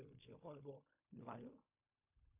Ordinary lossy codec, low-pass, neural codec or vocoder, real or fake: MP3, 24 kbps; 3.6 kHz; codec, 16 kHz in and 24 kHz out, 0.4 kbps, LongCat-Audio-Codec, fine tuned four codebook decoder; fake